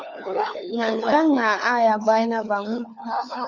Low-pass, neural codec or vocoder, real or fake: 7.2 kHz; codec, 16 kHz, 4 kbps, FunCodec, trained on LibriTTS, 50 frames a second; fake